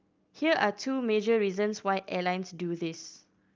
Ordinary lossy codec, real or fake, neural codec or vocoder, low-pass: Opus, 24 kbps; real; none; 7.2 kHz